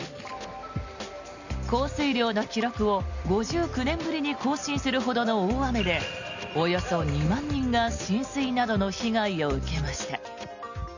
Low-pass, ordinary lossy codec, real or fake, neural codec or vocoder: 7.2 kHz; none; real; none